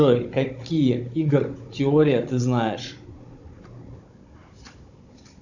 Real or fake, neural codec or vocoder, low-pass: fake; codec, 16 kHz, 16 kbps, FunCodec, trained on Chinese and English, 50 frames a second; 7.2 kHz